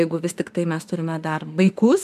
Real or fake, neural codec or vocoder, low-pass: fake; autoencoder, 48 kHz, 32 numbers a frame, DAC-VAE, trained on Japanese speech; 14.4 kHz